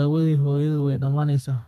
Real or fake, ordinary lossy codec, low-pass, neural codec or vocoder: fake; none; 14.4 kHz; codec, 32 kHz, 1.9 kbps, SNAC